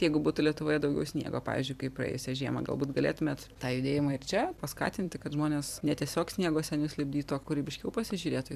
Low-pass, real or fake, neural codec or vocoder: 14.4 kHz; fake; vocoder, 44.1 kHz, 128 mel bands every 256 samples, BigVGAN v2